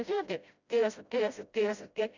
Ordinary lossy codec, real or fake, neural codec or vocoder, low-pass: none; fake; codec, 16 kHz, 0.5 kbps, FreqCodec, smaller model; 7.2 kHz